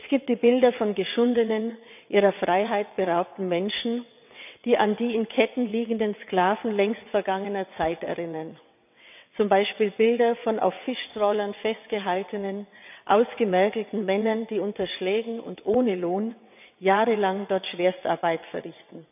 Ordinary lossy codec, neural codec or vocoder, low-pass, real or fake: none; vocoder, 22.05 kHz, 80 mel bands, WaveNeXt; 3.6 kHz; fake